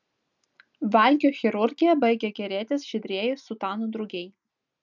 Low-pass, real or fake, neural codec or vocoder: 7.2 kHz; real; none